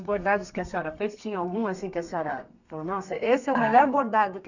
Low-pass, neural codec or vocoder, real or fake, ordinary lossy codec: 7.2 kHz; codec, 32 kHz, 1.9 kbps, SNAC; fake; none